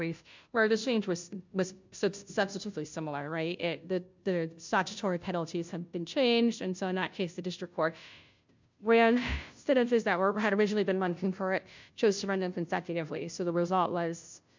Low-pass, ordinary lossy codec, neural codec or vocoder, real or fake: 7.2 kHz; MP3, 64 kbps; codec, 16 kHz, 0.5 kbps, FunCodec, trained on Chinese and English, 25 frames a second; fake